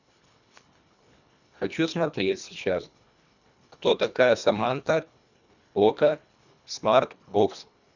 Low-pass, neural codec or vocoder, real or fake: 7.2 kHz; codec, 24 kHz, 1.5 kbps, HILCodec; fake